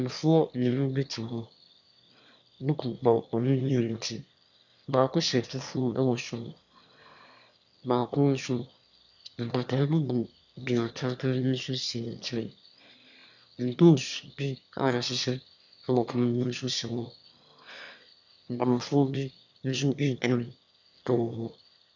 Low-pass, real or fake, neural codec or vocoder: 7.2 kHz; fake; autoencoder, 22.05 kHz, a latent of 192 numbers a frame, VITS, trained on one speaker